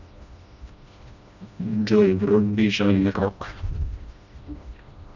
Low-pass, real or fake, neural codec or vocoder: 7.2 kHz; fake; codec, 16 kHz, 1 kbps, FreqCodec, smaller model